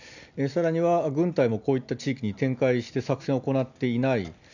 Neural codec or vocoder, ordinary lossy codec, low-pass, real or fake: none; none; 7.2 kHz; real